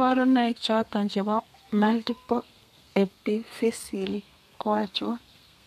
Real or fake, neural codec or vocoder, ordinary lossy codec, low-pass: fake; codec, 32 kHz, 1.9 kbps, SNAC; MP3, 96 kbps; 14.4 kHz